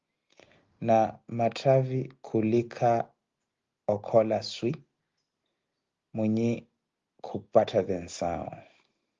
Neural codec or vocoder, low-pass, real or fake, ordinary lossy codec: none; 7.2 kHz; real; Opus, 32 kbps